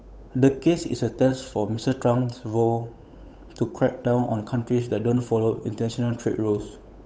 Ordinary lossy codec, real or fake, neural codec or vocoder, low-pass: none; fake; codec, 16 kHz, 8 kbps, FunCodec, trained on Chinese and English, 25 frames a second; none